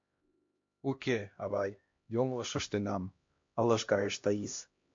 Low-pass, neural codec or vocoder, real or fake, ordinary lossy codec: 7.2 kHz; codec, 16 kHz, 1 kbps, X-Codec, HuBERT features, trained on LibriSpeech; fake; MP3, 48 kbps